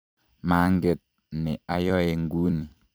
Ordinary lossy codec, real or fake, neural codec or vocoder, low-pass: none; fake; vocoder, 44.1 kHz, 128 mel bands every 256 samples, BigVGAN v2; none